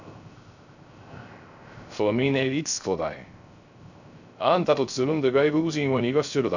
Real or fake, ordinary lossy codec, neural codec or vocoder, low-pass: fake; none; codec, 16 kHz, 0.3 kbps, FocalCodec; 7.2 kHz